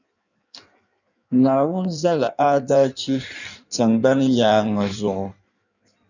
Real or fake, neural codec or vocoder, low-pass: fake; codec, 16 kHz in and 24 kHz out, 1.1 kbps, FireRedTTS-2 codec; 7.2 kHz